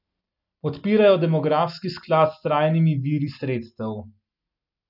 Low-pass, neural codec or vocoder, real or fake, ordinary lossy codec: 5.4 kHz; none; real; none